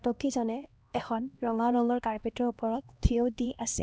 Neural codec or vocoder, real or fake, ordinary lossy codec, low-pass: codec, 16 kHz, 1 kbps, X-Codec, HuBERT features, trained on LibriSpeech; fake; none; none